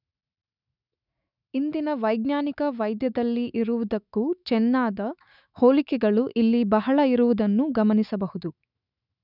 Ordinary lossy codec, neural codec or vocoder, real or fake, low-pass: none; autoencoder, 48 kHz, 128 numbers a frame, DAC-VAE, trained on Japanese speech; fake; 5.4 kHz